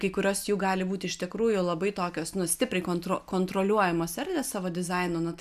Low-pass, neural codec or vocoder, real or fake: 14.4 kHz; none; real